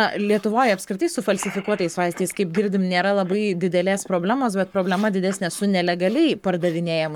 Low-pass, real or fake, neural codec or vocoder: 19.8 kHz; fake; codec, 44.1 kHz, 7.8 kbps, Pupu-Codec